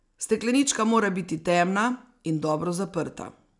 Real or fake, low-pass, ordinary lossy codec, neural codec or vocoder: real; 10.8 kHz; none; none